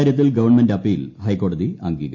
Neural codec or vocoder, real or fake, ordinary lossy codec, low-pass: none; real; MP3, 48 kbps; 7.2 kHz